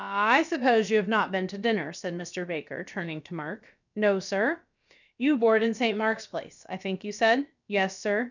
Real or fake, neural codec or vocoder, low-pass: fake; codec, 16 kHz, about 1 kbps, DyCAST, with the encoder's durations; 7.2 kHz